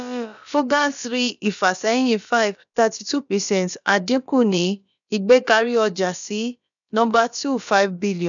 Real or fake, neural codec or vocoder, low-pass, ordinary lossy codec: fake; codec, 16 kHz, about 1 kbps, DyCAST, with the encoder's durations; 7.2 kHz; none